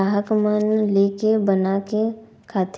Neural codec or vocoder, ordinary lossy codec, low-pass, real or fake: none; none; none; real